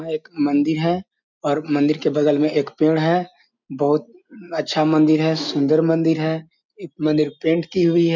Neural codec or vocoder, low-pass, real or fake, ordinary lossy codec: none; 7.2 kHz; real; none